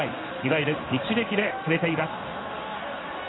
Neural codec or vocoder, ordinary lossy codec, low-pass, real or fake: codec, 16 kHz in and 24 kHz out, 1 kbps, XY-Tokenizer; AAC, 16 kbps; 7.2 kHz; fake